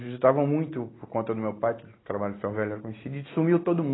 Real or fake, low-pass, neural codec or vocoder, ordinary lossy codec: real; 7.2 kHz; none; AAC, 16 kbps